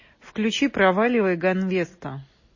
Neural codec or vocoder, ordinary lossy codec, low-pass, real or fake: none; MP3, 32 kbps; 7.2 kHz; real